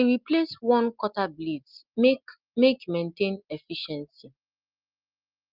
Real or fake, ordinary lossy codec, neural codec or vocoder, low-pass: real; Opus, 32 kbps; none; 5.4 kHz